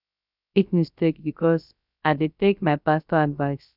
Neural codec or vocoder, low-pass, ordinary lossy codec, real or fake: codec, 16 kHz, 0.3 kbps, FocalCodec; 5.4 kHz; none; fake